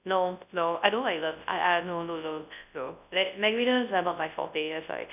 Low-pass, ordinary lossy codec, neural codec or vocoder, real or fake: 3.6 kHz; none; codec, 24 kHz, 0.9 kbps, WavTokenizer, large speech release; fake